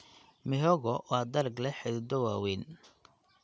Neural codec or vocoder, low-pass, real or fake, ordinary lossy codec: none; none; real; none